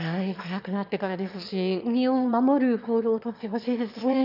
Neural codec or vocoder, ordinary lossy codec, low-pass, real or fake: autoencoder, 22.05 kHz, a latent of 192 numbers a frame, VITS, trained on one speaker; none; 5.4 kHz; fake